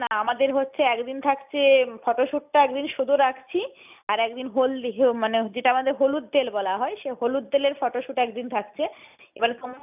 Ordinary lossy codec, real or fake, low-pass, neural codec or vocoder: none; real; 3.6 kHz; none